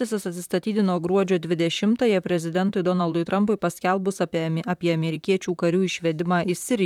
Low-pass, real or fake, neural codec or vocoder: 19.8 kHz; fake; vocoder, 44.1 kHz, 128 mel bands, Pupu-Vocoder